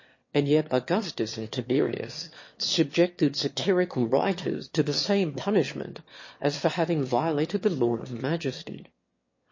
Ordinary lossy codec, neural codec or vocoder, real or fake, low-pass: MP3, 32 kbps; autoencoder, 22.05 kHz, a latent of 192 numbers a frame, VITS, trained on one speaker; fake; 7.2 kHz